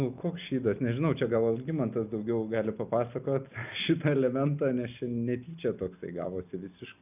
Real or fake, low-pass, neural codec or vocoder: real; 3.6 kHz; none